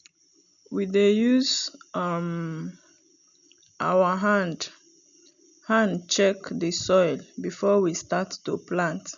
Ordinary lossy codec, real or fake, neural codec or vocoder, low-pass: none; real; none; 7.2 kHz